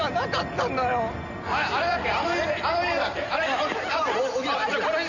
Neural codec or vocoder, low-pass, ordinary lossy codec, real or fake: none; 7.2 kHz; none; real